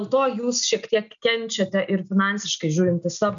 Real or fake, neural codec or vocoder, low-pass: real; none; 7.2 kHz